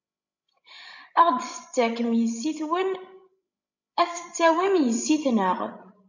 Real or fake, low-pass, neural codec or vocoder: fake; 7.2 kHz; codec, 16 kHz, 16 kbps, FreqCodec, larger model